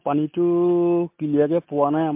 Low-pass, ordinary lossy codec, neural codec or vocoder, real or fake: 3.6 kHz; MP3, 32 kbps; none; real